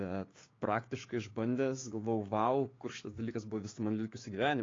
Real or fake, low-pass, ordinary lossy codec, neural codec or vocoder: fake; 7.2 kHz; AAC, 32 kbps; codec, 16 kHz, 6 kbps, DAC